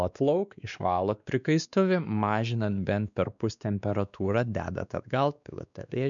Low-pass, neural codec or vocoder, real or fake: 7.2 kHz; codec, 16 kHz, 2 kbps, X-Codec, WavLM features, trained on Multilingual LibriSpeech; fake